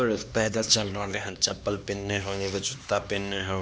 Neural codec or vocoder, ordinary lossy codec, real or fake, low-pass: codec, 16 kHz, 2 kbps, X-Codec, HuBERT features, trained on LibriSpeech; none; fake; none